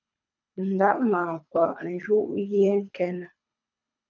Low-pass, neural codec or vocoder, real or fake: 7.2 kHz; codec, 24 kHz, 3 kbps, HILCodec; fake